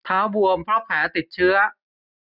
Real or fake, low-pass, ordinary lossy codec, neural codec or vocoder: real; 5.4 kHz; none; none